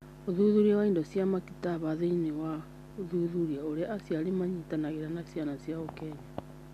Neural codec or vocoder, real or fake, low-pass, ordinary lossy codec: none; real; 14.4 kHz; MP3, 96 kbps